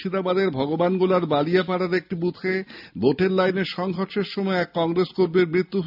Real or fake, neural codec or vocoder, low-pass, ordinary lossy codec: real; none; 5.4 kHz; none